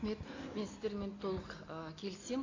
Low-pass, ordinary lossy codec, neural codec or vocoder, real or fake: 7.2 kHz; AAC, 32 kbps; none; real